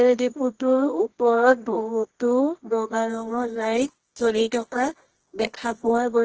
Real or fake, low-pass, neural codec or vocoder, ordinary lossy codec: fake; 7.2 kHz; codec, 24 kHz, 0.9 kbps, WavTokenizer, medium music audio release; Opus, 16 kbps